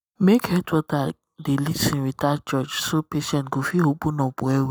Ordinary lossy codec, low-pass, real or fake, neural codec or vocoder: none; none; real; none